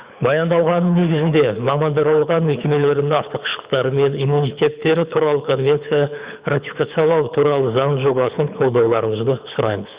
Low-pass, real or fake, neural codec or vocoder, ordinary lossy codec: 3.6 kHz; fake; codec, 24 kHz, 6 kbps, HILCodec; Opus, 64 kbps